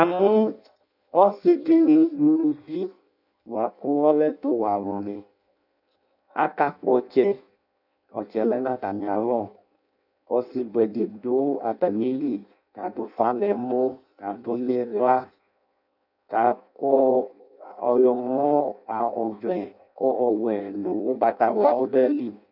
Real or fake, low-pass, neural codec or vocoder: fake; 5.4 kHz; codec, 16 kHz in and 24 kHz out, 0.6 kbps, FireRedTTS-2 codec